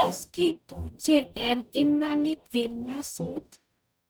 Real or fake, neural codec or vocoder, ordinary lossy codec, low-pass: fake; codec, 44.1 kHz, 0.9 kbps, DAC; none; none